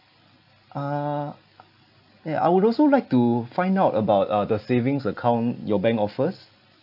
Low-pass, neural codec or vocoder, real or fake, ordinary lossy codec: 5.4 kHz; none; real; none